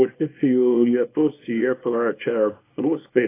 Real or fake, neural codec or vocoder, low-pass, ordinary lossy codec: fake; codec, 24 kHz, 0.9 kbps, WavTokenizer, small release; 3.6 kHz; AAC, 24 kbps